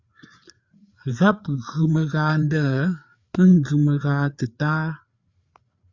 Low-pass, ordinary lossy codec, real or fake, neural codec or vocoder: 7.2 kHz; Opus, 64 kbps; fake; codec, 16 kHz, 4 kbps, FreqCodec, larger model